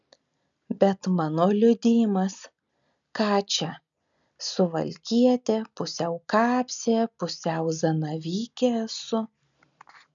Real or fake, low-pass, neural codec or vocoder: real; 7.2 kHz; none